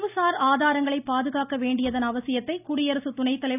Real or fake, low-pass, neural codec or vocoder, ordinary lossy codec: real; 3.6 kHz; none; none